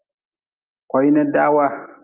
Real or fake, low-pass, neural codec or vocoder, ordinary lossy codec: real; 3.6 kHz; none; Opus, 32 kbps